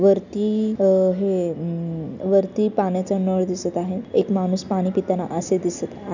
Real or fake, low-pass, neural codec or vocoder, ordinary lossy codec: real; 7.2 kHz; none; none